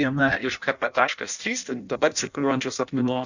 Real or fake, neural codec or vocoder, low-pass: fake; codec, 16 kHz in and 24 kHz out, 0.6 kbps, FireRedTTS-2 codec; 7.2 kHz